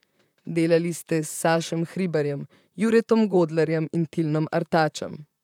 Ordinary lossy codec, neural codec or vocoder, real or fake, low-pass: none; vocoder, 44.1 kHz, 128 mel bands, Pupu-Vocoder; fake; 19.8 kHz